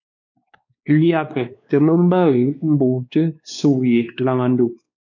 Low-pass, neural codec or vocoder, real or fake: 7.2 kHz; codec, 16 kHz, 2 kbps, X-Codec, WavLM features, trained on Multilingual LibriSpeech; fake